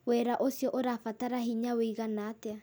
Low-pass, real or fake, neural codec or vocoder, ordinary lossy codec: none; real; none; none